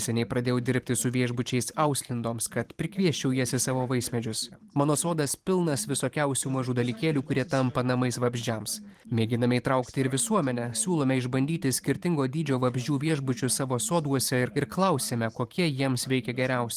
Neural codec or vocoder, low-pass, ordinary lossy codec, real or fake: vocoder, 44.1 kHz, 128 mel bands every 512 samples, BigVGAN v2; 14.4 kHz; Opus, 24 kbps; fake